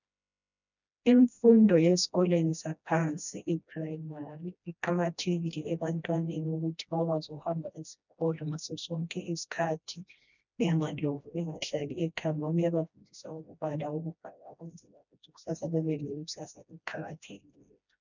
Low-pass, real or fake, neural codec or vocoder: 7.2 kHz; fake; codec, 16 kHz, 1 kbps, FreqCodec, smaller model